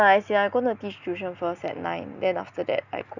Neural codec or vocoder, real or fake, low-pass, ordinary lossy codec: none; real; 7.2 kHz; none